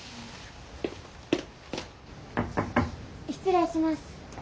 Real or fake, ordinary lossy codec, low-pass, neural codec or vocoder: real; none; none; none